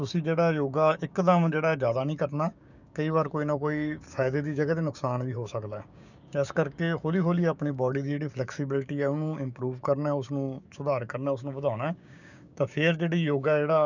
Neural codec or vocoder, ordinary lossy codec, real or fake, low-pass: codec, 16 kHz, 6 kbps, DAC; none; fake; 7.2 kHz